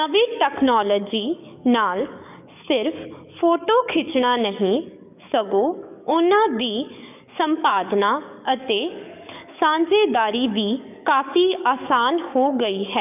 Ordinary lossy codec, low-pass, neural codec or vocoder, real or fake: none; 3.6 kHz; codec, 44.1 kHz, 7.8 kbps, DAC; fake